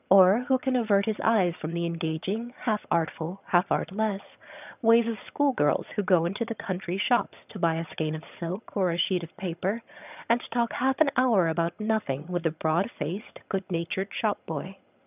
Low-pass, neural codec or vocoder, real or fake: 3.6 kHz; vocoder, 22.05 kHz, 80 mel bands, HiFi-GAN; fake